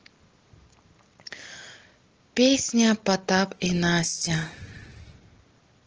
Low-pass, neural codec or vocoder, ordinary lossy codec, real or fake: 7.2 kHz; none; Opus, 16 kbps; real